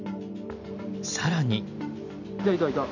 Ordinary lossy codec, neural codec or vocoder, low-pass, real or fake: none; none; 7.2 kHz; real